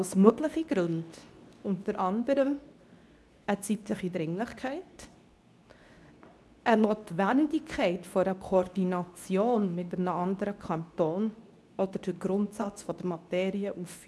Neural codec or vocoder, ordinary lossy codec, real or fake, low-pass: codec, 24 kHz, 0.9 kbps, WavTokenizer, medium speech release version 2; none; fake; none